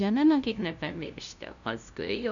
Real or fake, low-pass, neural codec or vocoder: fake; 7.2 kHz; codec, 16 kHz, 0.5 kbps, FunCodec, trained on LibriTTS, 25 frames a second